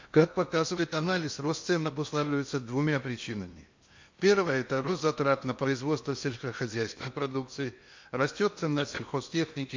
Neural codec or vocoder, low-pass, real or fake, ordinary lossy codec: codec, 16 kHz in and 24 kHz out, 0.8 kbps, FocalCodec, streaming, 65536 codes; 7.2 kHz; fake; MP3, 48 kbps